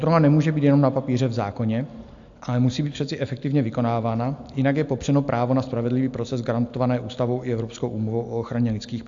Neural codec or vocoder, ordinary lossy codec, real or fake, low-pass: none; AAC, 64 kbps; real; 7.2 kHz